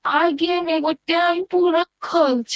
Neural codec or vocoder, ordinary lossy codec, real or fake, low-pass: codec, 16 kHz, 1 kbps, FreqCodec, smaller model; none; fake; none